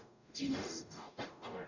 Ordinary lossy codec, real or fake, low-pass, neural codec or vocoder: none; fake; 7.2 kHz; codec, 44.1 kHz, 0.9 kbps, DAC